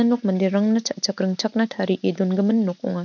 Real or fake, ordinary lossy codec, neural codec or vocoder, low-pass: real; none; none; 7.2 kHz